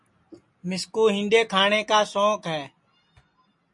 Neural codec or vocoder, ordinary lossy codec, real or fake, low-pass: none; MP3, 48 kbps; real; 10.8 kHz